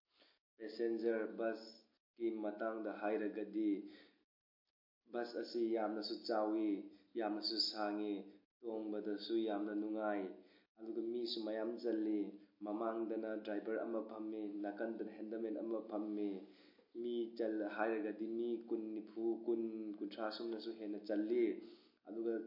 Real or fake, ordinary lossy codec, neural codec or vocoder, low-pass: real; MP3, 32 kbps; none; 5.4 kHz